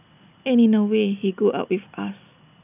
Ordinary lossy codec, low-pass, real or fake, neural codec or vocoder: none; 3.6 kHz; real; none